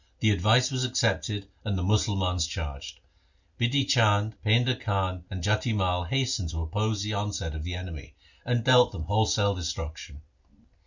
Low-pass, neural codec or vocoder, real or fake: 7.2 kHz; none; real